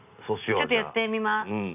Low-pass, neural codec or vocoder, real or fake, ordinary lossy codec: 3.6 kHz; none; real; none